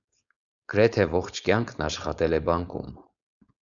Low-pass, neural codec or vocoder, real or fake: 7.2 kHz; codec, 16 kHz, 4.8 kbps, FACodec; fake